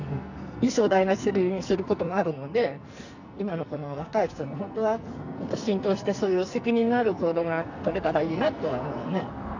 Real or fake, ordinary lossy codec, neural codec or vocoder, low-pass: fake; none; codec, 44.1 kHz, 2.6 kbps, SNAC; 7.2 kHz